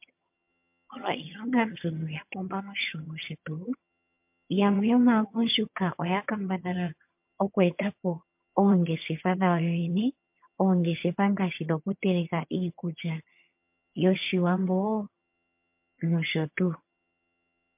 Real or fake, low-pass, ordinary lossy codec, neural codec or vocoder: fake; 3.6 kHz; MP3, 32 kbps; vocoder, 22.05 kHz, 80 mel bands, HiFi-GAN